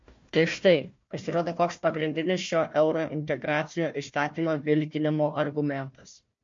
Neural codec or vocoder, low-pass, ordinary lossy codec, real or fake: codec, 16 kHz, 1 kbps, FunCodec, trained on Chinese and English, 50 frames a second; 7.2 kHz; MP3, 48 kbps; fake